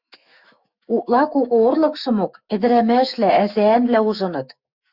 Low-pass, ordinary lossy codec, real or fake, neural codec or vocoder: 5.4 kHz; Opus, 64 kbps; fake; autoencoder, 48 kHz, 128 numbers a frame, DAC-VAE, trained on Japanese speech